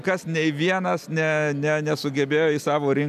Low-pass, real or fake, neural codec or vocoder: 14.4 kHz; real; none